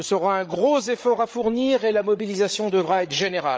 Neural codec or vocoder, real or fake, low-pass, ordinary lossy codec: codec, 16 kHz, 8 kbps, FreqCodec, larger model; fake; none; none